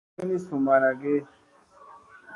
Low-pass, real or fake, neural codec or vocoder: 10.8 kHz; fake; codec, 44.1 kHz, 7.8 kbps, DAC